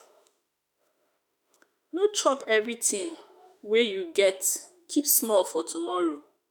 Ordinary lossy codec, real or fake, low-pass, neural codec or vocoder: none; fake; none; autoencoder, 48 kHz, 32 numbers a frame, DAC-VAE, trained on Japanese speech